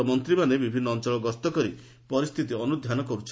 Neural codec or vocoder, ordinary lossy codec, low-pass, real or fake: none; none; none; real